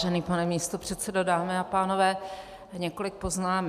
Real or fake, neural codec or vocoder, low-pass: real; none; 14.4 kHz